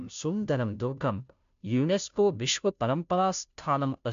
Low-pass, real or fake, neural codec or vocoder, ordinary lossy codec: 7.2 kHz; fake; codec, 16 kHz, 0.5 kbps, FunCodec, trained on Chinese and English, 25 frames a second; MP3, 64 kbps